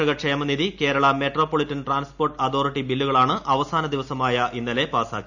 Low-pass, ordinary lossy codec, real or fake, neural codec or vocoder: 7.2 kHz; none; real; none